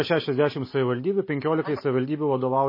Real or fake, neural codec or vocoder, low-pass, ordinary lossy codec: real; none; 5.4 kHz; MP3, 24 kbps